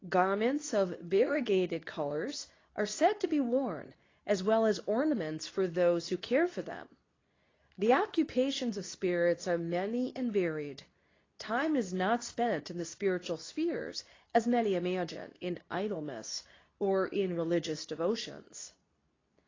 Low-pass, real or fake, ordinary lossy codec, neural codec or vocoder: 7.2 kHz; fake; AAC, 32 kbps; codec, 24 kHz, 0.9 kbps, WavTokenizer, medium speech release version 2